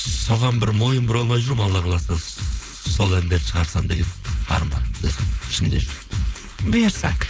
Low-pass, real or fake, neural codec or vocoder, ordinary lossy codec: none; fake; codec, 16 kHz, 4.8 kbps, FACodec; none